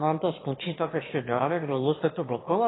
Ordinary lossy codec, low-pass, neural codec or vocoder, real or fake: AAC, 16 kbps; 7.2 kHz; autoencoder, 22.05 kHz, a latent of 192 numbers a frame, VITS, trained on one speaker; fake